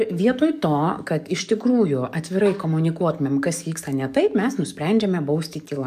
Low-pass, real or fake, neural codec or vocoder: 14.4 kHz; fake; codec, 44.1 kHz, 7.8 kbps, DAC